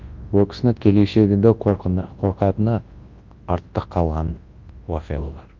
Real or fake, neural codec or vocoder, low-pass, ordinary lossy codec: fake; codec, 24 kHz, 0.9 kbps, WavTokenizer, large speech release; 7.2 kHz; Opus, 32 kbps